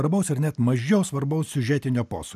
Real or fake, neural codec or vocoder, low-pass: real; none; 14.4 kHz